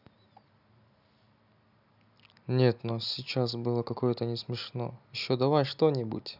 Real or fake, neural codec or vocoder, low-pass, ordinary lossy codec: real; none; 5.4 kHz; none